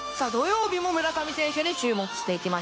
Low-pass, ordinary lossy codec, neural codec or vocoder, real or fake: none; none; codec, 16 kHz, 0.9 kbps, LongCat-Audio-Codec; fake